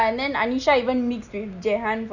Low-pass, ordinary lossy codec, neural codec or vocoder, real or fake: 7.2 kHz; none; none; real